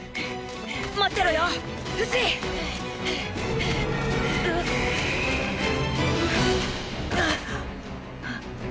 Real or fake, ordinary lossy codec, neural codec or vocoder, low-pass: real; none; none; none